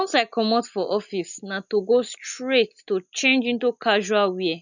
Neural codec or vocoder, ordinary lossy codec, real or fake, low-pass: none; none; real; 7.2 kHz